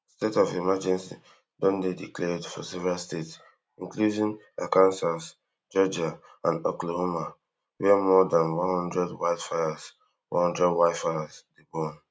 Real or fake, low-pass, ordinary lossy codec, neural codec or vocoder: real; none; none; none